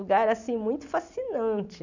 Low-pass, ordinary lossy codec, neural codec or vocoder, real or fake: 7.2 kHz; none; none; real